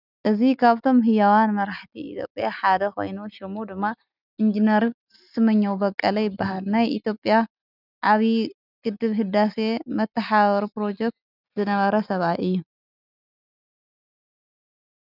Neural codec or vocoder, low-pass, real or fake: none; 5.4 kHz; real